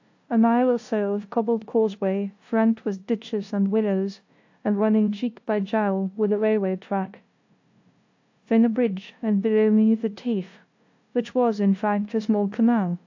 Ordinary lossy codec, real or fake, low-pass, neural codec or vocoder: AAC, 48 kbps; fake; 7.2 kHz; codec, 16 kHz, 0.5 kbps, FunCodec, trained on LibriTTS, 25 frames a second